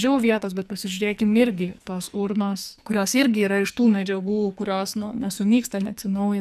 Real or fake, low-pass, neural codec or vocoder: fake; 14.4 kHz; codec, 44.1 kHz, 2.6 kbps, SNAC